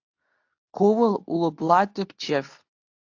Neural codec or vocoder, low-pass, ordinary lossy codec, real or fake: codec, 24 kHz, 0.9 kbps, WavTokenizer, medium speech release version 2; 7.2 kHz; AAC, 48 kbps; fake